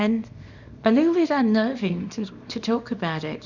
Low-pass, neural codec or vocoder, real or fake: 7.2 kHz; codec, 24 kHz, 0.9 kbps, WavTokenizer, small release; fake